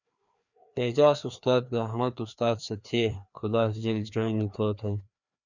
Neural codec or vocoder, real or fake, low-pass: codec, 16 kHz, 2 kbps, FreqCodec, larger model; fake; 7.2 kHz